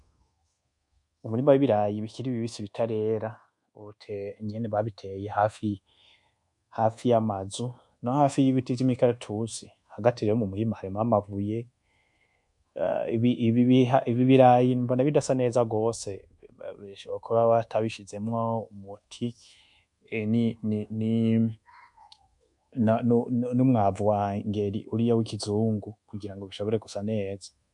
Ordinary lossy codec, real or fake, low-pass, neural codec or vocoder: MP3, 64 kbps; fake; 10.8 kHz; codec, 24 kHz, 1.2 kbps, DualCodec